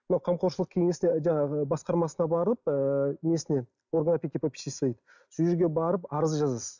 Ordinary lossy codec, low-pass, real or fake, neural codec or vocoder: none; 7.2 kHz; real; none